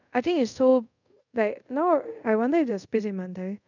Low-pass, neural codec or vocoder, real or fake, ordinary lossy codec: 7.2 kHz; codec, 24 kHz, 0.5 kbps, DualCodec; fake; none